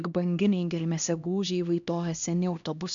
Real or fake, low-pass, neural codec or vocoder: fake; 7.2 kHz; codec, 16 kHz, 1 kbps, X-Codec, HuBERT features, trained on LibriSpeech